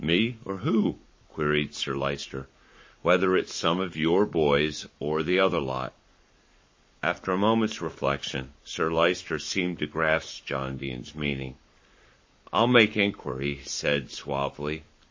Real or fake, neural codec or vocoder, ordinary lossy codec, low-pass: fake; codec, 44.1 kHz, 7.8 kbps, Pupu-Codec; MP3, 32 kbps; 7.2 kHz